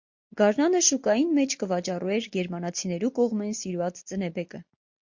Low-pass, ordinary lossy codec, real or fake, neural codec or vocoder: 7.2 kHz; MP3, 48 kbps; real; none